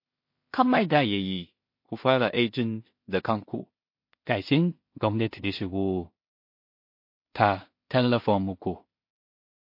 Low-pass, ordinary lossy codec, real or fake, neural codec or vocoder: 5.4 kHz; MP3, 32 kbps; fake; codec, 16 kHz in and 24 kHz out, 0.4 kbps, LongCat-Audio-Codec, two codebook decoder